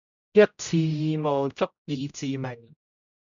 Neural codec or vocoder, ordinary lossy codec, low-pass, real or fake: codec, 16 kHz, 0.5 kbps, X-Codec, HuBERT features, trained on general audio; MP3, 96 kbps; 7.2 kHz; fake